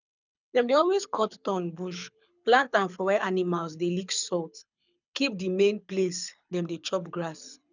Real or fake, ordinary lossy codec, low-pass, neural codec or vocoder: fake; none; 7.2 kHz; codec, 24 kHz, 6 kbps, HILCodec